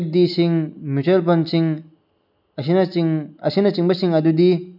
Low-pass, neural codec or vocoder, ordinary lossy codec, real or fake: 5.4 kHz; none; none; real